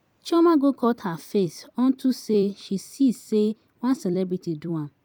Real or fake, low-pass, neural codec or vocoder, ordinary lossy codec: fake; 19.8 kHz; vocoder, 44.1 kHz, 128 mel bands every 256 samples, BigVGAN v2; none